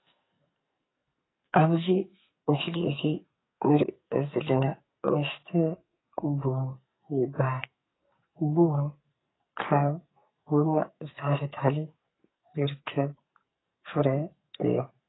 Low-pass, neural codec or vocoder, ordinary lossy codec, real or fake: 7.2 kHz; codec, 44.1 kHz, 2.6 kbps, SNAC; AAC, 16 kbps; fake